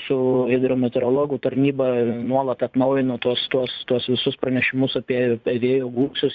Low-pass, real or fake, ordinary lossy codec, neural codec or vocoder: 7.2 kHz; fake; Opus, 64 kbps; vocoder, 44.1 kHz, 80 mel bands, Vocos